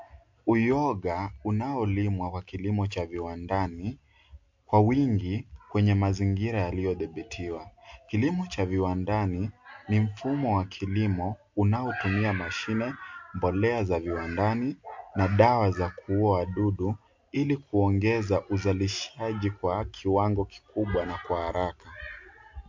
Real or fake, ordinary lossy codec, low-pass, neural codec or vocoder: real; MP3, 48 kbps; 7.2 kHz; none